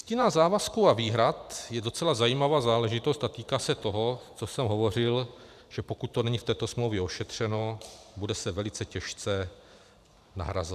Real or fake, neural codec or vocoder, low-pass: fake; vocoder, 44.1 kHz, 128 mel bands every 512 samples, BigVGAN v2; 14.4 kHz